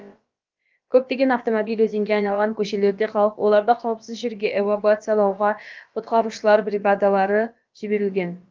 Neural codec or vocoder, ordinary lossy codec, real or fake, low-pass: codec, 16 kHz, about 1 kbps, DyCAST, with the encoder's durations; Opus, 24 kbps; fake; 7.2 kHz